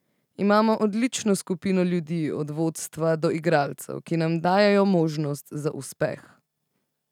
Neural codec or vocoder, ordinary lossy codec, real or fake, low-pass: none; none; real; 19.8 kHz